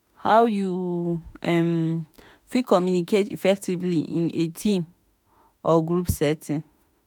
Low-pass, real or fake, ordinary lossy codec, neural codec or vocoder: none; fake; none; autoencoder, 48 kHz, 32 numbers a frame, DAC-VAE, trained on Japanese speech